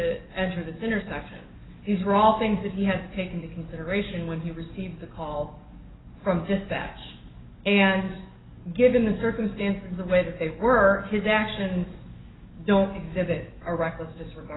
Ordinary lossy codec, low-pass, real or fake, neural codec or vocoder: AAC, 16 kbps; 7.2 kHz; fake; codec, 44.1 kHz, 7.8 kbps, DAC